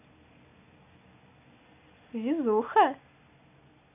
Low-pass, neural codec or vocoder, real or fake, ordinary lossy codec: 3.6 kHz; none; real; none